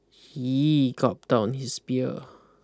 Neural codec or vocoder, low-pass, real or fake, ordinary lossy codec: none; none; real; none